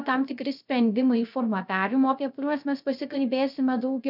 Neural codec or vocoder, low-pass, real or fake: codec, 16 kHz, 0.3 kbps, FocalCodec; 5.4 kHz; fake